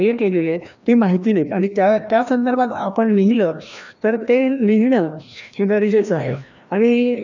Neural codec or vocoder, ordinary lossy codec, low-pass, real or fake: codec, 16 kHz, 1 kbps, FreqCodec, larger model; none; 7.2 kHz; fake